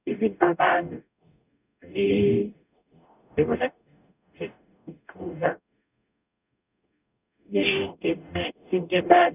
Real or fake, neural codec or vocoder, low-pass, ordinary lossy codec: fake; codec, 44.1 kHz, 0.9 kbps, DAC; 3.6 kHz; none